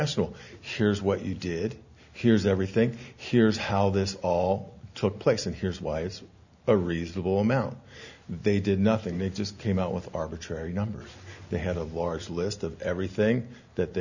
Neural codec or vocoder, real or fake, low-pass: none; real; 7.2 kHz